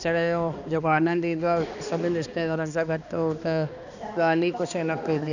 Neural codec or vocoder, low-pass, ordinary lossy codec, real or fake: codec, 16 kHz, 2 kbps, X-Codec, HuBERT features, trained on balanced general audio; 7.2 kHz; none; fake